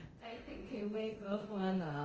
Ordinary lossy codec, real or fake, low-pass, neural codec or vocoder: Opus, 24 kbps; fake; 7.2 kHz; codec, 24 kHz, 0.9 kbps, DualCodec